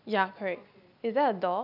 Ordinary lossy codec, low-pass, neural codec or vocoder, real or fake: none; 5.4 kHz; none; real